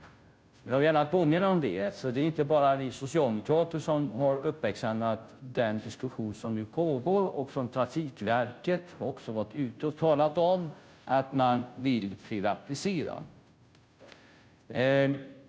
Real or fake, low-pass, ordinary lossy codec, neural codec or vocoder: fake; none; none; codec, 16 kHz, 0.5 kbps, FunCodec, trained on Chinese and English, 25 frames a second